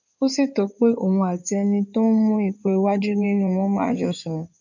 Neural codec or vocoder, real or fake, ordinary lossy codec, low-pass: codec, 16 kHz in and 24 kHz out, 2.2 kbps, FireRedTTS-2 codec; fake; none; 7.2 kHz